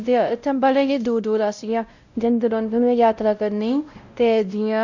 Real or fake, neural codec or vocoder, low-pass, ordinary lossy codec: fake; codec, 16 kHz, 0.5 kbps, X-Codec, WavLM features, trained on Multilingual LibriSpeech; 7.2 kHz; none